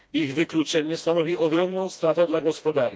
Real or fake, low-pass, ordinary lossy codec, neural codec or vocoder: fake; none; none; codec, 16 kHz, 1 kbps, FreqCodec, smaller model